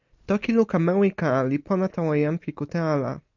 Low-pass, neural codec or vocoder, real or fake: 7.2 kHz; none; real